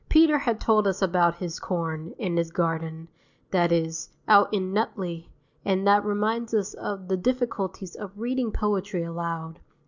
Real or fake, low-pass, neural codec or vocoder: real; 7.2 kHz; none